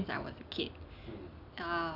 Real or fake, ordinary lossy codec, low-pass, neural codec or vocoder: real; none; 5.4 kHz; none